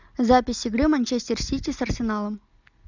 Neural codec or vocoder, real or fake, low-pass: none; real; 7.2 kHz